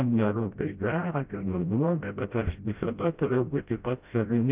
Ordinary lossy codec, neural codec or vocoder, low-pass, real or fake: Opus, 24 kbps; codec, 16 kHz, 0.5 kbps, FreqCodec, smaller model; 3.6 kHz; fake